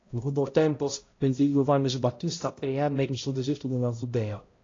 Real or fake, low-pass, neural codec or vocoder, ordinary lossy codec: fake; 7.2 kHz; codec, 16 kHz, 0.5 kbps, X-Codec, HuBERT features, trained on balanced general audio; AAC, 32 kbps